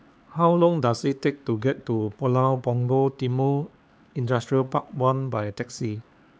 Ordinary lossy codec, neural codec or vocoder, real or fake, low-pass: none; codec, 16 kHz, 4 kbps, X-Codec, HuBERT features, trained on LibriSpeech; fake; none